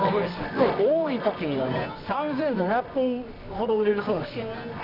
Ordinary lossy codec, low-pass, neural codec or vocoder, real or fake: none; 5.4 kHz; codec, 24 kHz, 0.9 kbps, WavTokenizer, medium music audio release; fake